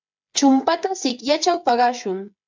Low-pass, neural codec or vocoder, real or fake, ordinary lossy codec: 7.2 kHz; codec, 16 kHz, 8 kbps, FreqCodec, smaller model; fake; MP3, 64 kbps